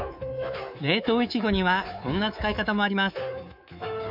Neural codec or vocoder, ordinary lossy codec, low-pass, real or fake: codec, 24 kHz, 3.1 kbps, DualCodec; none; 5.4 kHz; fake